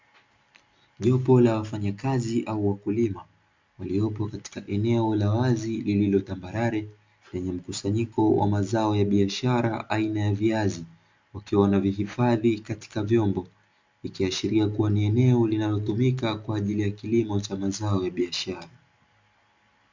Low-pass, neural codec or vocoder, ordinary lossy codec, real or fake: 7.2 kHz; none; MP3, 64 kbps; real